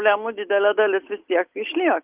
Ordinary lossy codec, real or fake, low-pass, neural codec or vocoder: Opus, 24 kbps; real; 3.6 kHz; none